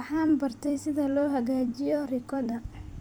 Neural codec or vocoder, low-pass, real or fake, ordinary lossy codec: vocoder, 44.1 kHz, 128 mel bands every 256 samples, BigVGAN v2; none; fake; none